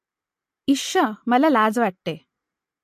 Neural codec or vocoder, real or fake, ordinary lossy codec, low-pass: none; real; MP3, 64 kbps; 14.4 kHz